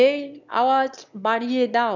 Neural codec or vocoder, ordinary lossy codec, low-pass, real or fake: autoencoder, 22.05 kHz, a latent of 192 numbers a frame, VITS, trained on one speaker; none; 7.2 kHz; fake